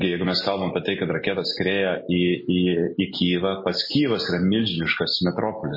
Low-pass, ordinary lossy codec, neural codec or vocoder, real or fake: 5.4 kHz; MP3, 24 kbps; none; real